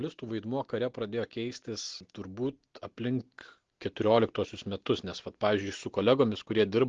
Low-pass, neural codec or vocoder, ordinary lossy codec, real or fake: 7.2 kHz; none; Opus, 16 kbps; real